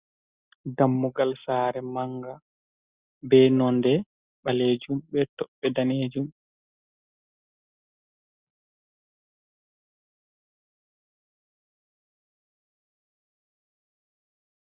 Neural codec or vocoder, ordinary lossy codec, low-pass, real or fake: none; Opus, 64 kbps; 3.6 kHz; real